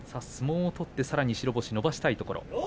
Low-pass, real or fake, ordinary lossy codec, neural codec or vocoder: none; real; none; none